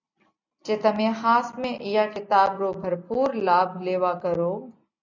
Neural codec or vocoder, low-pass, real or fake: none; 7.2 kHz; real